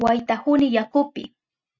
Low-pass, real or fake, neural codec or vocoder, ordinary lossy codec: 7.2 kHz; real; none; Opus, 64 kbps